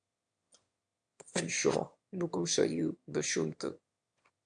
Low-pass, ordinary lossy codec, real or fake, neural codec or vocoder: 9.9 kHz; MP3, 64 kbps; fake; autoencoder, 22.05 kHz, a latent of 192 numbers a frame, VITS, trained on one speaker